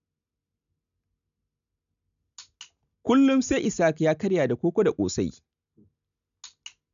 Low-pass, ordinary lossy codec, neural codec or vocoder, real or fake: 7.2 kHz; none; none; real